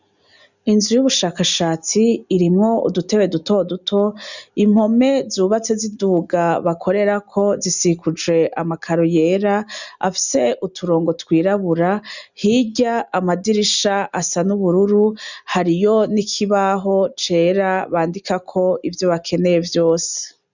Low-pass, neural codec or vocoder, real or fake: 7.2 kHz; none; real